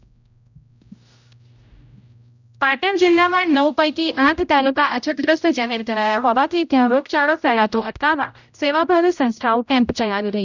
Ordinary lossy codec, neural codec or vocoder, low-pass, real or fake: none; codec, 16 kHz, 0.5 kbps, X-Codec, HuBERT features, trained on general audio; 7.2 kHz; fake